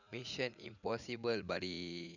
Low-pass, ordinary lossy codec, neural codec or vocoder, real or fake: 7.2 kHz; none; vocoder, 44.1 kHz, 80 mel bands, Vocos; fake